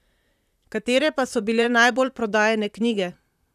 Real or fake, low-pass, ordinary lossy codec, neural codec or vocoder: fake; 14.4 kHz; none; vocoder, 44.1 kHz, 128 mel bands, Pupu-Vocoder